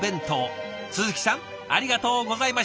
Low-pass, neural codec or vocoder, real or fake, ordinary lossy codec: none; none; real; none